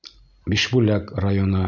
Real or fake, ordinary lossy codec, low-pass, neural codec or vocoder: real; Opus, 64 kbps; 7.2 kHz; none